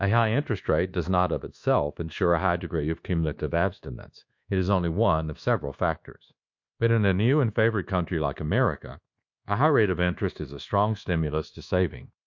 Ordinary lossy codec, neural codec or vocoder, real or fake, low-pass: MP3, 48 kbps; codec, 24 kHz, 1.2 kbps, DualCodec; fake; 7.2 kHz